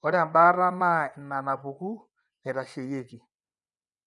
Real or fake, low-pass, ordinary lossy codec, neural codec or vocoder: fake; 10.8 kHz; none; vocoder, 24 kHz, 100 mel bands, Vocos